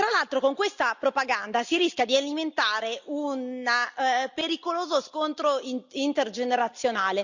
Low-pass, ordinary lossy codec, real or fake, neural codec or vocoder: 7.2 kHz; Opus, 64 kbps; real; none